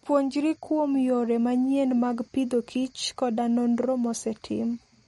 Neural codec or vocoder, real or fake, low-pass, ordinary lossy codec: none; real; 19.8 kHz; MP3, 48 kbps